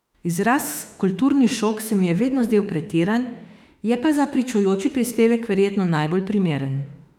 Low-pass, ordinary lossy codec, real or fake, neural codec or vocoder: 19.8 kHz; none; fake; autoencoder, 48 kHz, 32 numbers a frame, DAC-VAE, trained on Japanese speech